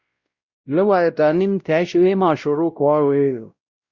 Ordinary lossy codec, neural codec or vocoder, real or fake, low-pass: Opus, 64 kbps; codec, 16 kHz, 0.5 kbps, X-Codec, WavLM features, trained on Multilingual LibriSpeech; fake; 7.2 kHz